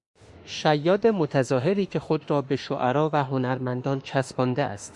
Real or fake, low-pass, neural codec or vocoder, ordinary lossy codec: fake; 10.8 kHz; autoencoder, 48 kHz, 32 numbers a frame, DAC-VAE, trained on Japanese speech; Opus, 64 kbps